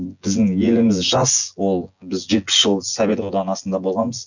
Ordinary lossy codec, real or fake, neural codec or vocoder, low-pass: none; fake; vocoder, 24 kHz, 100 mel bands, Vocos; 7.2 kHz